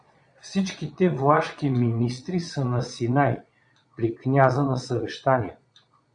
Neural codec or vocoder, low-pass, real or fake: vocoder, 22.05 kHz, 80 mel bands, Vocos; 9.9 kHz; fake